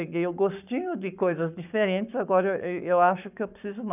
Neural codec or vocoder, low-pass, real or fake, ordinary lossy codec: codec, 44.1 kHz, 7.8 kbps, Pupu-Codec; 3.6 kHz; fake; none